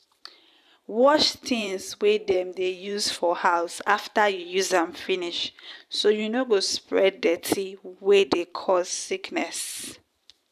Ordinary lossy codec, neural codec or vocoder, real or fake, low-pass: none; vocoder, 44.1 kHz, 128 mel bands every 512 samples, BigVGAN v2; fake; 14.4 kHz